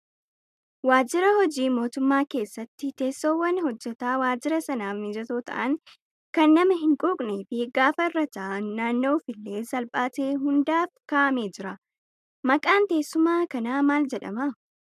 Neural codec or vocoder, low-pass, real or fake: none; 14.4 kHz; real